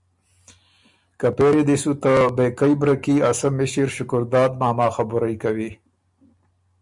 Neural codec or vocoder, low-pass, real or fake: none; 10.8 kHz; real